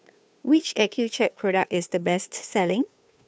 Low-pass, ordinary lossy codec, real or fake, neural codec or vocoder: none; none; fake; codec, 16 kHz, 2 kbps, FunCodec, trained on Chinese and English, 25 frames a second